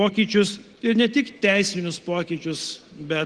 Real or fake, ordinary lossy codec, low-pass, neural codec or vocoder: real; Opus, 16 kbps; 10.8 kHz; none